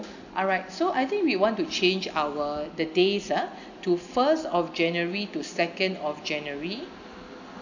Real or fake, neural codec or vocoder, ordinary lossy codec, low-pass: real; none; none; 7.2 kHz